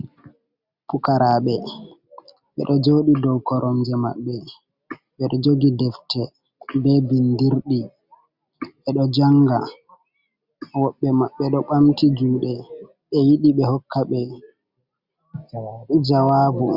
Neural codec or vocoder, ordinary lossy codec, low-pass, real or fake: none; Opus, 64 kbps; 5.4 kHz; real